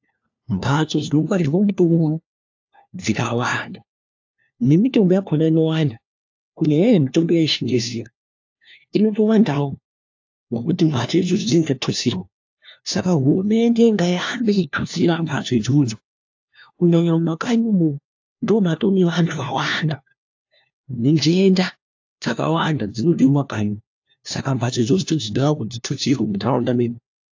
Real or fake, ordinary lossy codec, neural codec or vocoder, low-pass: fake; AAC, 48 kbps; codec, 16 kHz, 1 kbps, FunCodec, trained on LibriTTS, 50 frames a second; 7.2 kHz